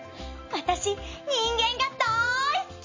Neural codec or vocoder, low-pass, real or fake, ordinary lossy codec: none; 7.2 kHz; real; MP3, 32 kbps